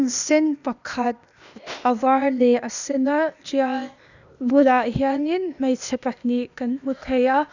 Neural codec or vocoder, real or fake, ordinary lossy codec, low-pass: codec, 16 kHz, 0.8 kbps, ZipCodec; fake; none; 7.2 kHz